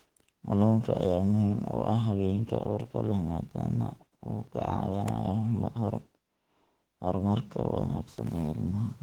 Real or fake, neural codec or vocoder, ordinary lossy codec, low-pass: fake; autoencoder, 48 kHz, 32 numbers a frame, DAC-VAE, trained on Japanese speech; Opus, 16 kbps; 19.8 kHz